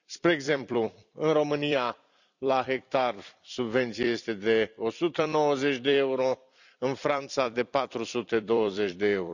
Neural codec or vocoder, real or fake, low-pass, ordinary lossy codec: vocoder, 44.1 kHz, 128 mel bands every 256 samples, BigVGAN v2; fake; 7.2 kHz; none